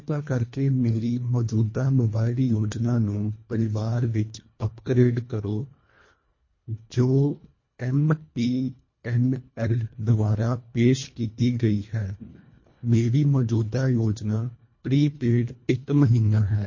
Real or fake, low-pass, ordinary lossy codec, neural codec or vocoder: fake; 7.2 kHz; MP3, 32 kbps; codec, 24 kHz, 1.5 kbps, HILCodec